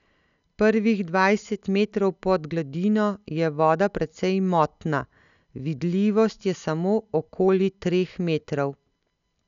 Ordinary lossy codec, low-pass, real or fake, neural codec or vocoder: none; 7.2 kHz; real; none